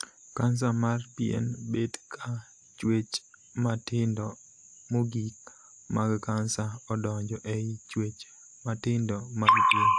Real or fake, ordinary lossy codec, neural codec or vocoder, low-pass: real; AAC, 64 kbps; none; 9.9 kHz